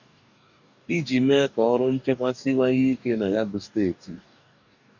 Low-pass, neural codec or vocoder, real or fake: 7.2 kHz; codec, 44.1 kHz, 2.6 kbps, DAC; fake